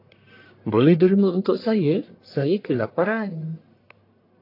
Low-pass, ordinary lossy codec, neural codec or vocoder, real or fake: 5.4 kHz; AAC, 32 kbps; codec, 44.1 kHz, 1.7 kbps, Pupu-Codec; fake